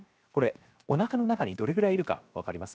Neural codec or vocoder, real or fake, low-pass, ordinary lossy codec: codec, 16 kHz, 0.7 kbps, FocalCodec; fake; none; none